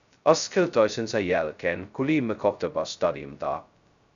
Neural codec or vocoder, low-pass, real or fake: codec, 16 kHz, 0.2 kbps, FocalCodec; 7.2 kHz; fake